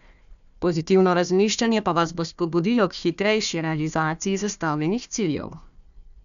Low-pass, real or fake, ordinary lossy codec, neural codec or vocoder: 7.2 kHz; fake; none; codec, 16 kHz, 1 kbps, FunCodec, trained on Chinese and English, 50 frames a second